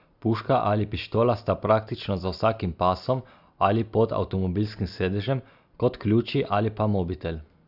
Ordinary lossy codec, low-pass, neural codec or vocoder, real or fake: none; 5.4 kHz; none; real